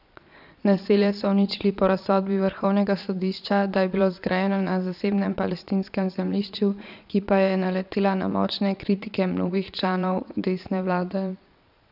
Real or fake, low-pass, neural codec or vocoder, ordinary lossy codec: fake; 5.4 kHz; vocoder, 22.05 kHz, 80 mel bands, Vocos; none